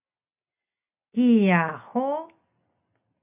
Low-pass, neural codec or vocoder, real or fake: 3.6 kHz; none; real